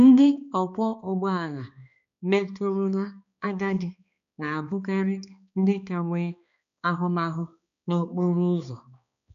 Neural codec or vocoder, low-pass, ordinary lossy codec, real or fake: codec, 16 kHz, 2 kbps, X-Codec, HuBERT features, trained on balanced general audio; 7.2 kHz; none; fake